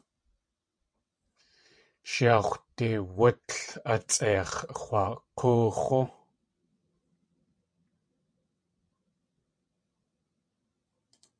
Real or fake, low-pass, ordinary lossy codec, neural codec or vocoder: fake; 9.9 kHz; MP3, 48 kbps; vocoder, 22.05 kHz, 80 mel bands, WaveNeXt